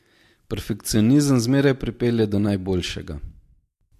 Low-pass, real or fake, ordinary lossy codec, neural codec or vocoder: 14.4 kHz; real; MP3, 64 kbps; none